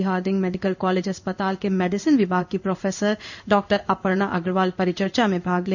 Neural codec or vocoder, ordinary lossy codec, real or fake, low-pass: codec, 16 kHz in and 24 kHz out, 1 kbps, XY-Tokenizer; none; fake; 7.2 kHz